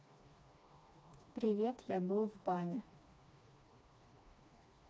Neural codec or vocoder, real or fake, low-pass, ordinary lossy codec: codec, 16 kHz, 2 kbps, FreqCodec, smaller model; fake; none; none